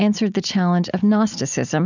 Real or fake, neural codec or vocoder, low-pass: real; none; 7.2 kHz